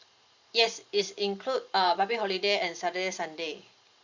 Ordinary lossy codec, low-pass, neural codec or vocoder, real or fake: Opus, 64 kbps; 7.2 kHz; vocoder, 44.1 kHz, 128 mel bands every 512 samples, BigVGAN v2; fake